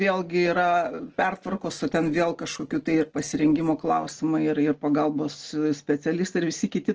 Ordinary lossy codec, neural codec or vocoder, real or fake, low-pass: Opus, 16 kbps; none; real; 7.2 kHz